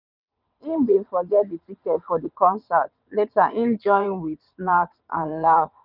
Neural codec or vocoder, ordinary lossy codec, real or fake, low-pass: vocoder, 22.05 kHz, 80 mel bands, WaveNeXt; none; fake; 5.4 kHz